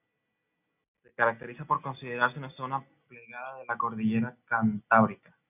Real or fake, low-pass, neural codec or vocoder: real; 3.6 kHz; none